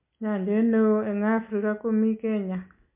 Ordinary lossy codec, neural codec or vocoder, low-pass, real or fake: MP3, 24 kbps; none; 3.6 kHz; real